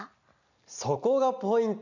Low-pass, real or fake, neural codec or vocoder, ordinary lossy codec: 7.2 kHz; real; none; none